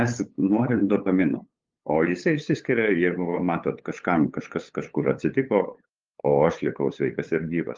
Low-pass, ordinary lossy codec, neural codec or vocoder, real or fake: 7.2 kHz; Opus, 32 kbps; codec, 16 kHz, 8 kbps, FunCodec, trained on LibriTTS, 25 frames a second; fake